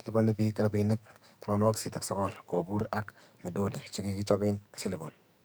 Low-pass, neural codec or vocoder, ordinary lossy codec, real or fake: none; codec, 44.1 kHz, 2.6 kbps, SNAC; none; fake